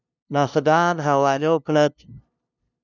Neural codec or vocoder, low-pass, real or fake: codec, 16 kHz, 0.5 kbps, FunCodec, trained on LibriTTS, 25 frames a second; 7.2 kHz; fake